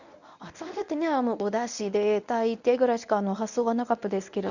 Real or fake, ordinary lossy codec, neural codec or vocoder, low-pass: fake; none; codec, 24 kHz, 0.9 kbps, WavTokenizer, medium speech release version 1; 7.2 kHz